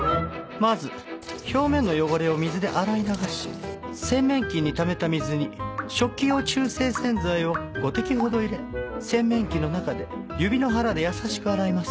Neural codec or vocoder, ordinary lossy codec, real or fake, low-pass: none; none; real; none